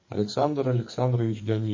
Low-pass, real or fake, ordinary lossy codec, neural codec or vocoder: 7.2 kHz; fake; MP3, 32 kbps; codec, 44.1 kHz, 2.6 kbps, SNAC